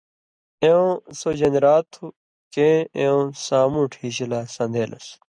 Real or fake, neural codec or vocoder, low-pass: real; none; 9.9 kHz